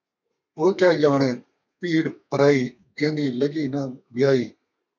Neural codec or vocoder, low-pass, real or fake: codec, 32 kHz, 1.9 kbps, SNAC; 7.2 kHz; fake